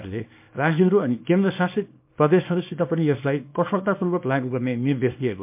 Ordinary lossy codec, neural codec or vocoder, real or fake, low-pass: MP3, 32 kbps; codec, 24 kHz, 0.9 kbps, WavTokenizer, small release; fake; 3.6 kHz